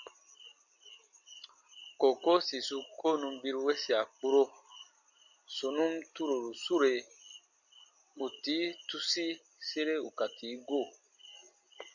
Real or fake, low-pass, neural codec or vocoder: real; 7.2 kHz; none